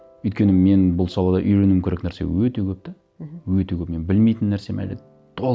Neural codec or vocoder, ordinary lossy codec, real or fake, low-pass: none; none; real; none